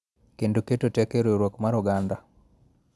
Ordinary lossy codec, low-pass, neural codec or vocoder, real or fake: none; none; none; real